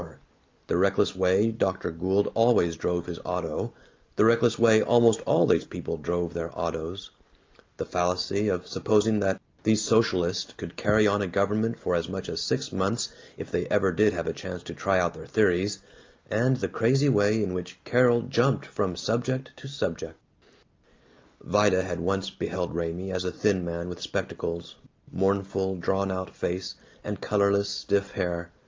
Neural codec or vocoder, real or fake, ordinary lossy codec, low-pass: none; real; Opus, 24 kbps; 7.2 kHz